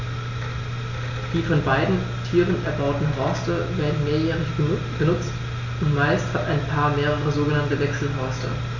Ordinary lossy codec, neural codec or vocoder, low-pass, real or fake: none; none; 7.2 kHz; real